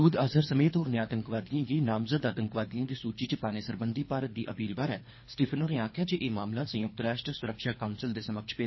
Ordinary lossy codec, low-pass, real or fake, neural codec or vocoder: MP3, 24 kbps; 7.2 kHz; fake; codec, 24 kHz, 3 kbps, HILCodec